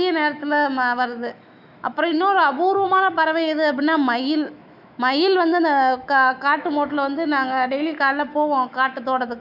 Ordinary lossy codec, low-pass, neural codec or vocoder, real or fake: none; 5.4 kHz; autoencoder, 48 kHz, 128 numbers a frame, DAC-VAE, trained on Japanese speech; fake